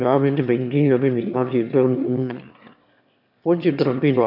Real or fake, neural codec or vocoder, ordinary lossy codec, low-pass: fake; autoencoder, 22.05 kHz, a latent of 192 numbers a frame, VITS, trained on one speaker; none; 5.4 kHz